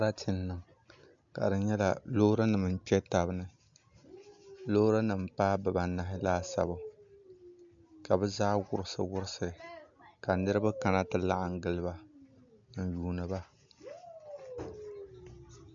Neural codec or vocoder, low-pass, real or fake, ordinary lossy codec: none; 7.2 kHz; real; MP3, 64 kbps